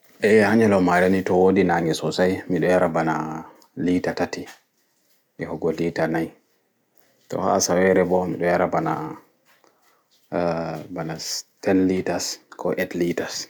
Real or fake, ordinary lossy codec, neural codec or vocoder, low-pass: real; none; none; none